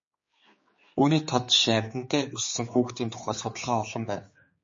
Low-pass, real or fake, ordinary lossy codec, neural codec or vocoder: 7.2 kHz; fake; MP3, 32 kbps; codec, 16 kHz, 4 kbps, X-Codec, HuBERT features, trained on general audio